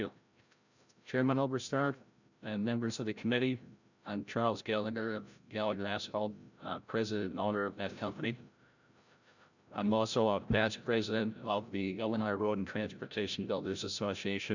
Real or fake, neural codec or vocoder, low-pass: fake; codec, 16 kHz, 0.5 kbps, FreqCodec, larger model; 7.2 kHz